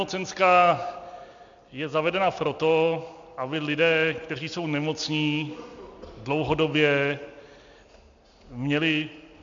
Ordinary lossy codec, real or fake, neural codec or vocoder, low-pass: MP3, 64 kbps; real; none; 7.2 kHz